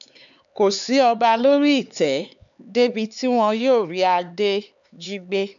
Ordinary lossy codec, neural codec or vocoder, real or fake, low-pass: MP3, 96 kbps; codec, 16 kHz, 4 kbps, X-Codec, HuBERT features, trained on LibriSpeech; fake; 7.2 kHz